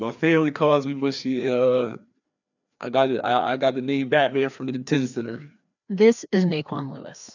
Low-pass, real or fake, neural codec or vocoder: 7.2 kHz; fake; codec, 16 kHz, 2 kbps, FreqCodec, larger model